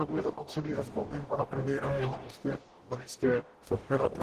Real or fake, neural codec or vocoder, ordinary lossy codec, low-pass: fake; codec, 44.1 kHz, 0.9 kbps, DAC; Opus, 16 kbps; 14.4 kHz